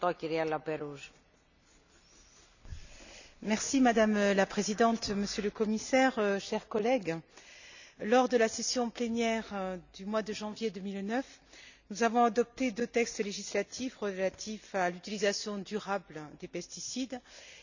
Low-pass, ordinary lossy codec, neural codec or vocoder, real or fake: 7.2 kHz; none; none; real